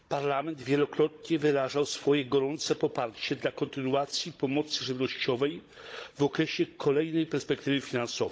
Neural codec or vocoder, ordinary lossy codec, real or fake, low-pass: codec, 16 kHz, 16 kbps, FunCodec, trained on Chinese and English, 50 frames a second; none; fake; none